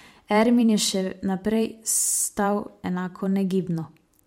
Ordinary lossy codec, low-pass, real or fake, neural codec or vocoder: MP3, 64 kbps; 19.8 kHz; fake; vocoder, 44.1 kHz, 128 mel bands every 512 samples, BigVGAN v2